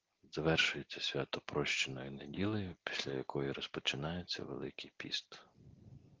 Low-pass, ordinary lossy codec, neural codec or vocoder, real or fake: 7.2 kHz; Opus, 16 kbps; none; real